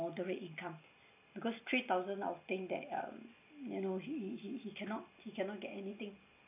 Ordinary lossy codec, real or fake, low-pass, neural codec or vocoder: none; real; 3.6 kHz; none